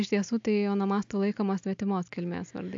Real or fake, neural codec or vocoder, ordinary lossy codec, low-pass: real; none; AAC, 64 kbps; 7.2 kHz